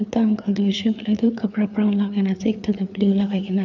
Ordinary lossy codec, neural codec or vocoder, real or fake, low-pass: none; codec, 16 kHz, 4 kbps, FreqCodec, larger model; fake; 7.2 kHz